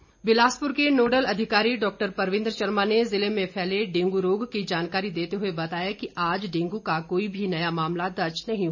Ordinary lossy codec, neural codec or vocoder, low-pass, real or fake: none; none; 7.2 kHz; real